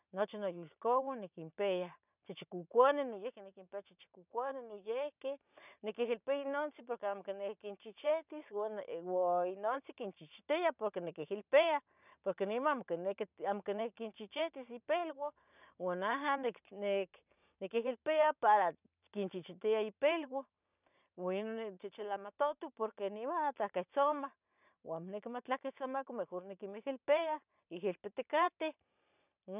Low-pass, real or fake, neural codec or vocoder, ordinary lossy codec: 3.6 kHz; fake; vocoder, 44.1 kHz, 128 mel bands every 512 samples, BigVGAN v2; none